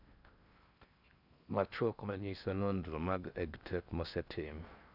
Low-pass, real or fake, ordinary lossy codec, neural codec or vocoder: 5.4 kHz; fake; none; codec, 16 kHz in and 24 kHz out, 0.6 kbps, FocalCodec, streaming, 4096 codes